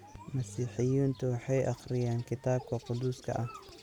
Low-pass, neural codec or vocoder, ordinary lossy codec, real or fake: 19.8 kHz; none; none; real